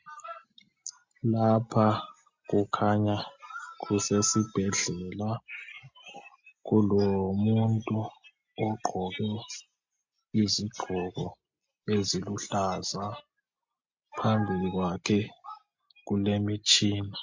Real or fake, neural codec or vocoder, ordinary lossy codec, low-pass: real; none; MP3, 48 kbps; 7.2 kHz